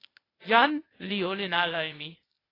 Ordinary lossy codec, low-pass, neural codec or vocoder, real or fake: AAC, 24 kbps; 5.4 kHz; codec, 16 kHz, 0.8 kbps, ZipCodec; fake